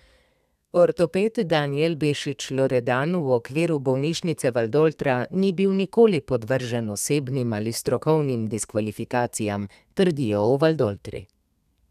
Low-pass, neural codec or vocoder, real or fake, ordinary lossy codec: 14.4 kHz; codec, 32 kHz, 1.9 kbps, SNAC; fake; none